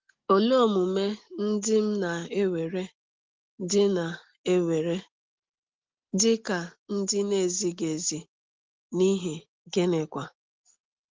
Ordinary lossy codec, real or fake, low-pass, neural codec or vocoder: Opus, 16 kbps; real; 7.2 kHz; none